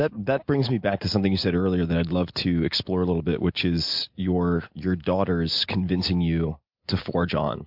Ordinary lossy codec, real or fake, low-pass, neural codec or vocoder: MP3, 48 kbps; real; 5.4 kHz; none